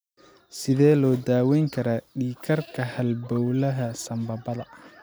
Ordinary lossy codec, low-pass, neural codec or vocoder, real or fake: none; none; none; real